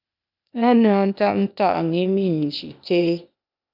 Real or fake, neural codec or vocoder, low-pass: fake; codec, 16 kHz, 0.8 kbps, ZipCodec; 5.4 kHz